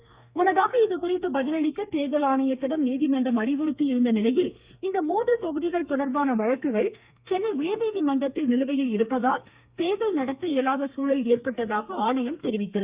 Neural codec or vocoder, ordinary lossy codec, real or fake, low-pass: codec, 32 kHz, 1.9 kbps, SNAC; Opus, 64 kbps; fake; 3.6 kHz